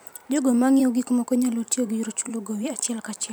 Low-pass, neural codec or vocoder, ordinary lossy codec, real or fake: none; vocoder, 44.1 kHz, 128 mel bands every 512 samples, BigVGAN v2; none; fake